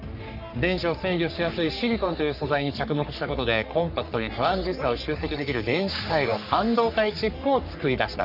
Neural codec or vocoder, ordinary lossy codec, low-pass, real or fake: codec, 44.1 kHz, 3.4 kbps, Pupu-Codec; none; 5.4 kHz; fake